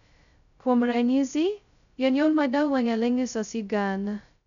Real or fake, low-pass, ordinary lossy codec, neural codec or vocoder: fake; 7.2 kHz; none; codec, 16 kHz, 0.2 kbps, FocalCodec